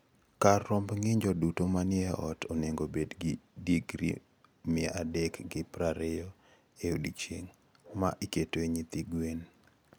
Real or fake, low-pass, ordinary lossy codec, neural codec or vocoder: real; none; none; none